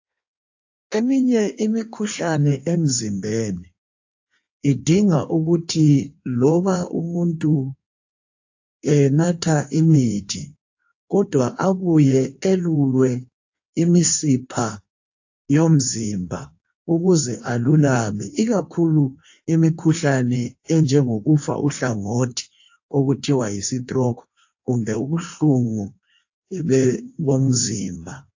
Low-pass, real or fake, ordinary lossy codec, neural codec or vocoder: 7.2 kHz; fake; AAC, 48 kbps; codec, 16 kHz in and 24 kHz out, 1.1 kbps, FireRedTTS-2 codec